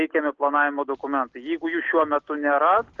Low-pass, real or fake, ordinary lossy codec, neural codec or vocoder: 7.2 kHz; real; Opus, 16 kbps; none